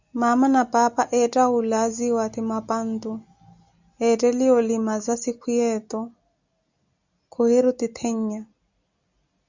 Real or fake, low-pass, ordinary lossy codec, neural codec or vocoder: real; 7.2 kHz; Opus, 64 kbps; none